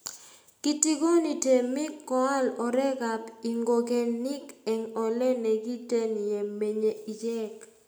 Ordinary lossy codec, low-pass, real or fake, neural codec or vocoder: none; none; real; none